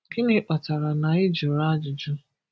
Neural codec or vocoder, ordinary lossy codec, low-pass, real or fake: none; none; none; real